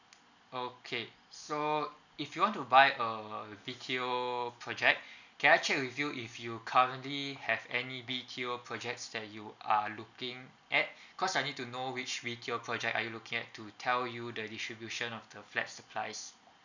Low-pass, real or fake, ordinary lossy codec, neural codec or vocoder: 7.2 kHz; real; none; none